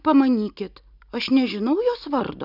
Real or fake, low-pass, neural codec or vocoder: real; 5.4 kHz; none